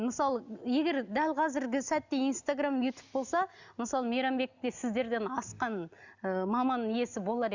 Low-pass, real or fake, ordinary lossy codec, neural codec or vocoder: 7.2 kHz; real; Opus, 64 kbps; none